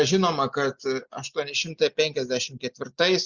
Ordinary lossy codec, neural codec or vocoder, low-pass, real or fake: Opus, 64 kbps; none; 7.2 kHz; real